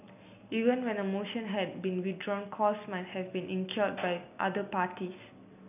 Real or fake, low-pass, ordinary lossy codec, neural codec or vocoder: real; 3.6 kHz; none; none